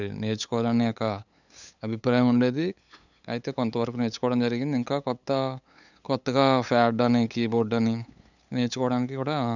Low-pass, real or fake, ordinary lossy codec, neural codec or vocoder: 7.2 kHz; fake; none; codec, 16 kHz, 8 kbps, FunCodec, trained on LibriTTS, 25 frames a second